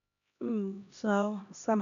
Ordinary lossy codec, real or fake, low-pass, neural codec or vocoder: none; fake; 7.2 kHz; codec, 16 kHz, 1 kbps, X-Codec, HuBERT features, trained on LibriSpeech